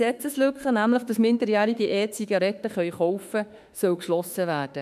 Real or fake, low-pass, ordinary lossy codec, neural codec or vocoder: fake; 14.4 kHz; none; autoencoder, 48 kHz, 32 numbers a frame, DAC-VAE, trained on Japanese speech